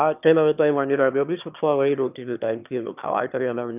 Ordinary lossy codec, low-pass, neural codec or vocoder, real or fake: none; 3.6 kHz; autoencoder, 22.05 kHz, a latent of 192 numbers a frame, VITS, trained on one speaker; fake